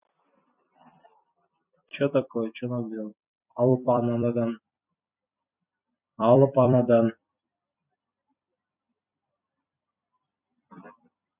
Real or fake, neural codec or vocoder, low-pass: fake; vocoder, 44.1 kHz, 128 mel bands every 256 samples, BigVGAN v2; 3.6 kHz